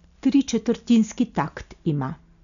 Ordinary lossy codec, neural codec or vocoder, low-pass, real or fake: none; none; 7.2 kHz; real